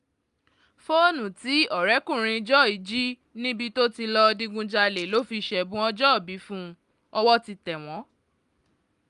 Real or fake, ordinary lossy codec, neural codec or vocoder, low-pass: real; Opus, 32 kbps; none; 10.8 kHz